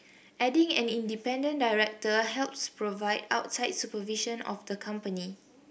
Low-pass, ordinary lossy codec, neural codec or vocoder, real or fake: none; none; none; real